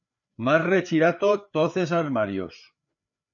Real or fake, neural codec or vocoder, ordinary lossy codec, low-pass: fake; codec, 16 kHz, 4 kbps, FreqCodec, larger model; MP3, 96 kbps; 7.2 kHz